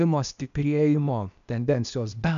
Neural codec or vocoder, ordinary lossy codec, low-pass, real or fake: codec, 16 kHz, 0.8 kbps, ZipCodec; AAC, 96 kbps; 7.2 kHz; fake